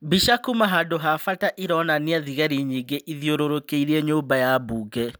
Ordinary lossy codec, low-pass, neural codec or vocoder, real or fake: none; none; none; real